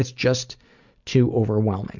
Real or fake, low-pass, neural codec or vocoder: real; 7.2 kHz; none